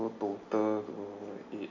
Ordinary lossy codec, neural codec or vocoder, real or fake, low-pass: none; none; real; 7.2 kHz